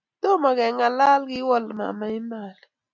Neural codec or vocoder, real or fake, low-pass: none; real; 7.2 kHz